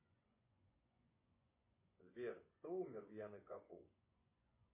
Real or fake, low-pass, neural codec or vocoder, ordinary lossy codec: fake; 3.6 kHz; vocoder, 24 kHz, 100 mel bands, Vocos; MP3, 24 kbps